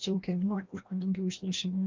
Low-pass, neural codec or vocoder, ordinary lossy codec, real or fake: 7.2 kHz; codec, 16 kHz, 1 kbps, FreqCodec, larger model; Opus, 32 kbps; fake